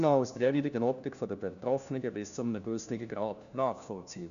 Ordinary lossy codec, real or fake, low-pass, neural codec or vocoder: none; fake; 7.2 kHz; codec, 16 kHz, 1 kbps, FunCodec, trained on LibriTTS, 50 frames a second